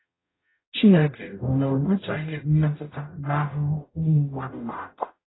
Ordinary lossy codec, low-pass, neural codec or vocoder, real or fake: AAC, 16 kbps; 7.2 kHz; codec, 44.1 kHz, 0.9 kbps, DAC; fake